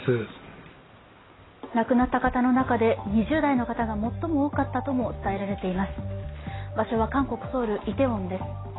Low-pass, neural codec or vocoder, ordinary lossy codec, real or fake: 7.2 kHz; none; AAC, 16 kbps; real